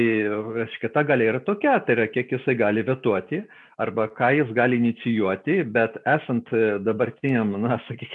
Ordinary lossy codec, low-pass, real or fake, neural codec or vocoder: MP3, 96 kbps; 10.8 kHz; real; none